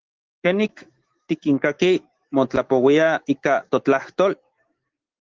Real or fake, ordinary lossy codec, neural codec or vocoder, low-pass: fake; Opus, 16 kbps; autoencoder, 48 kHz, 128 numbers a frame, DAC-VAE, trained on Japanese speech; 7.2 kHz